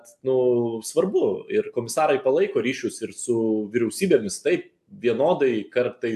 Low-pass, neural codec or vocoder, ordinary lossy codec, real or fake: 14.4 kHz; none; AAC, 96 kbps; real